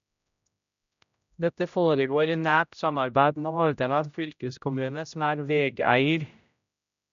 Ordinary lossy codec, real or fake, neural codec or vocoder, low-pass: none; fake; codec, 16 kHz, 0.5 kbps, X-Codec, HuBERT features, trained on general audio; 7.2 kHz